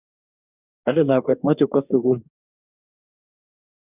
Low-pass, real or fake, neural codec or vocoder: 3.6 kHz; fake; codec, 44.1 kHz, 2.6 kbps, DAC